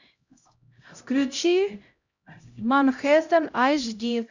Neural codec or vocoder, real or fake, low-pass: codec, 16 kHz, 0.5 kbps, X-Codec, HuBERT features, trained on LibriSpeech; fake; 7.2 kHz